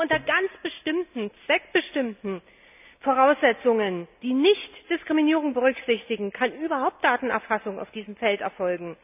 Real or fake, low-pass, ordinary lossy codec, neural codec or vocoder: real; 3.6 kHz; none; none